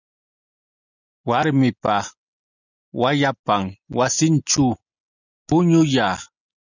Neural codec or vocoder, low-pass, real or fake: none; 7.2 kHz; real